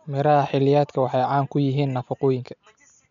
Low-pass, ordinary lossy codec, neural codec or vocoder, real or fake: 7.2 kHz; none; none; real